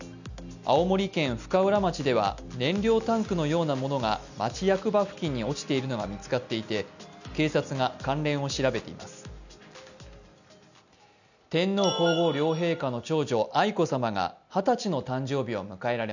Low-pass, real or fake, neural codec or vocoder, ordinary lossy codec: 7.2 kHz; real; none; none